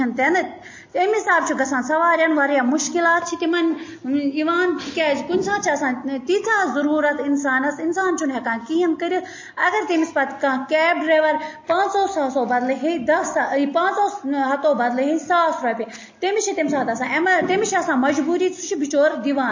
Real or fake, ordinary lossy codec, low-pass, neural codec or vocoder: real; MP3, 32 kbps; 7.2 kHz; none